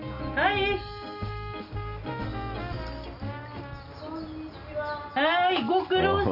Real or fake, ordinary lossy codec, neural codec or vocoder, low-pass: real; none; none; 5.4 kHz